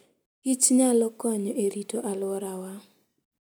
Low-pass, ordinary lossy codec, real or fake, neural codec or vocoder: none; none; real; none